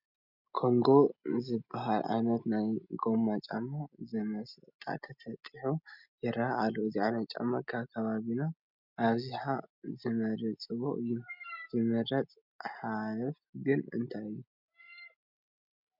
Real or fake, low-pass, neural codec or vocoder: real; 5.4 kHz; none